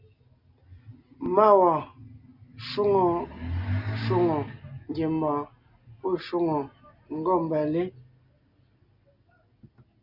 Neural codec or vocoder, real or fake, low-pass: none; real; 5.4 kHz